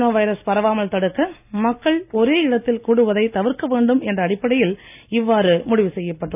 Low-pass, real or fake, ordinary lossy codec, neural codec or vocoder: 3.6 kHz; real; none; none